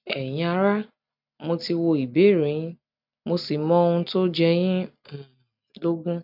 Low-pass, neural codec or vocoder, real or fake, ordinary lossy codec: 5.4 kHz; none; real; none